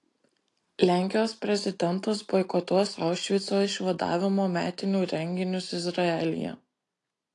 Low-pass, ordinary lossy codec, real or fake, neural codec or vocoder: 10.8 kHz; AAC, 48 kbps; real; none